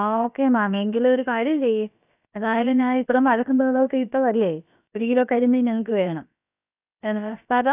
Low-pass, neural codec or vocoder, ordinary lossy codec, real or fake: 3.6 kHz; codec, 16 kHz, about 1 kbps, DyCAST, with the encoder's durations; none; fake